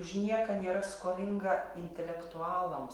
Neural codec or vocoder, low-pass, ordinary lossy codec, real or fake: autoencoder, 48 kHz, 128 numbers a frame, DAC-VAE, trained on Japanese speech; 19.8 kHz; Opus, 24 kbps; fake